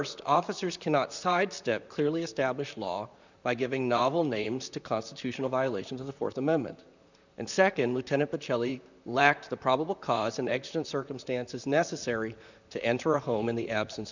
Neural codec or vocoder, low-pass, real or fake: vocoder, 44.1 kHz, 128 mel bands, Pupu-Vocoder; 7.2 kHz; fake